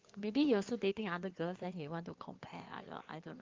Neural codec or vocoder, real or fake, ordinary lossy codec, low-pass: codec, 16 kHz in and 24 kHz out, 2.2 kbps, FireRedTTS-2 codec; fake; Opus, 32 kbps; 7.2 kHz